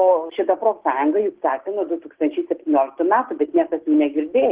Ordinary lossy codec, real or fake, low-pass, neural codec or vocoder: Opus, 16 kbps; real; 3.6 kHz; none